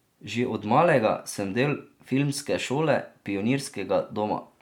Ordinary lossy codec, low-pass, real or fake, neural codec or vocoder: MP3, 96 kbps; 19.8 kHz; real; none